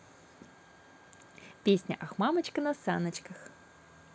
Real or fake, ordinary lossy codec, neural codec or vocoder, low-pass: real; none; none; none